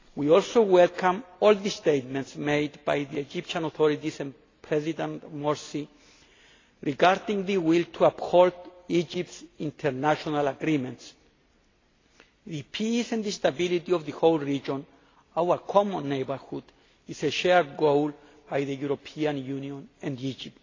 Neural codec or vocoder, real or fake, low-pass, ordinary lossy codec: none; real; 7.2 kHz; AAC, 32 kbps